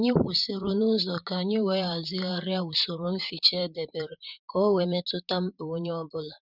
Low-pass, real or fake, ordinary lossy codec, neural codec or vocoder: 5.4 kHz; fake; none; vocoder, 44.1 kHz, 128 mel bands, Pupu-Vocoder